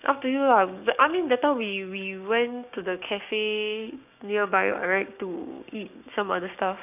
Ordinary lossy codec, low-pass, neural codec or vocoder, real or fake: none; 3.6 kHz; codec, 16 kHz, 6 kbps, DAC; fake